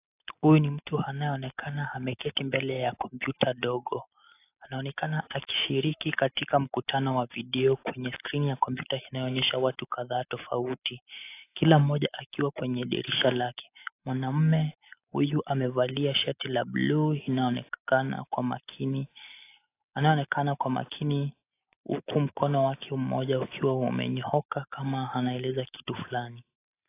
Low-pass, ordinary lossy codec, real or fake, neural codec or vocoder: 3.6 kHz; AAC, 24 kbps; real; none